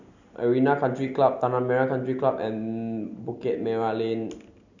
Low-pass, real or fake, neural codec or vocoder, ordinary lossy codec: 7.2 kHz; real; none; none